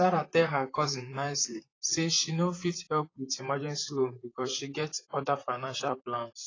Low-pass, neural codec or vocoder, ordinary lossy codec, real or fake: 7.2 kHz; vocoder, 44.1 kHz, 128 mel bands, Pupu-Vocoder; AAC, 32 kbps; fake